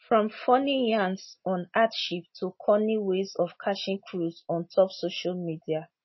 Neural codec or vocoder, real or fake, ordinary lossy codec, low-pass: none; real; MP3, 24 kbps; 7.2 kHz